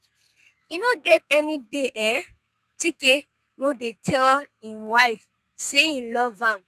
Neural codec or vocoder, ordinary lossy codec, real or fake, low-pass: codec, 32 kHz, 1.9 kbps, SNAC; none; fake; 14.4 kHz